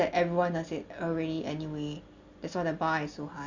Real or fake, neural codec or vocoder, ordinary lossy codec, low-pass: real; none; Opus, 64 kbps; 7.2 kHz